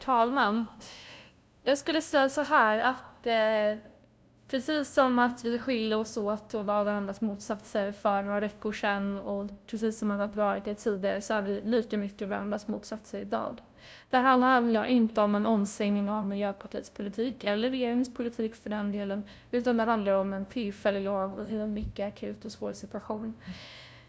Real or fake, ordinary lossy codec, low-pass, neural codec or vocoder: fake; none; none; codec, 16 kHz, 0.5 kbps, FunCodec, trained on LibriTTS, 25 frames a second